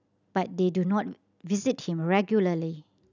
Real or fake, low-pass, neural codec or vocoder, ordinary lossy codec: real; 7.2 kHz; none; none